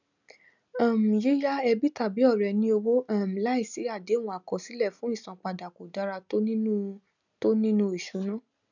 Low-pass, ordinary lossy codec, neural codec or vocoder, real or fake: 7.2 kHz; none; none; real